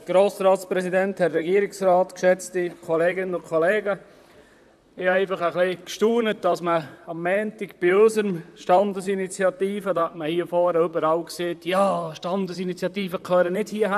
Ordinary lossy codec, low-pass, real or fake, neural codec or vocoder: none; 14.4 kHz; fake; vocoder, 44.1 kHz, 128 mel bands, Pupu-Vocoder